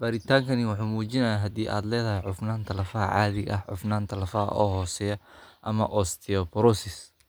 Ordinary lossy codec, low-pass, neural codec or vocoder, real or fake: none; none; none; real